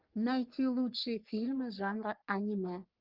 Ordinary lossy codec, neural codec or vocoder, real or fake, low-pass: Opus, 32 kbps; codec, 44.1 kHz, 3.4 kbps, Pupu-Codec; fake; 5.4 kHz